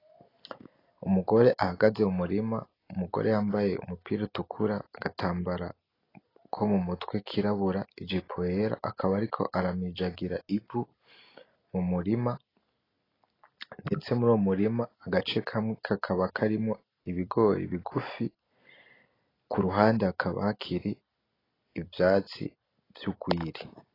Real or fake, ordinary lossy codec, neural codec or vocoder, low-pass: real; AAC, 24 kbps; none; 5.4 kHz